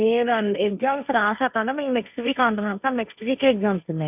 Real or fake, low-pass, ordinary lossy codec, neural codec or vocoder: fake; 3.6 kHz; none; codec, 16 kHz, 1.1 kbps, Voila-Tokenizer